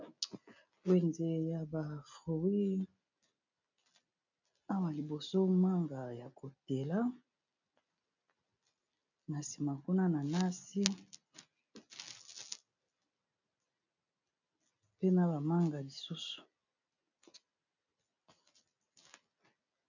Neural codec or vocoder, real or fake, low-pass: none; real; 7.2 kHz